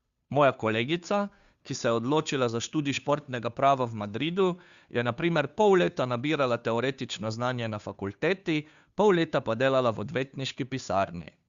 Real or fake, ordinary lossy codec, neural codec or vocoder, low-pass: fake; Opus, 64 kbps; codec, 16 kHz, 2 kbps, FunCodec, trained on Chinese and English, 25 frames a second; 7.2 kHz